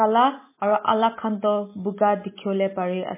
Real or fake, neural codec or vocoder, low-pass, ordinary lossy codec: real; none; 3.6 kHz; MP3, 16 kbps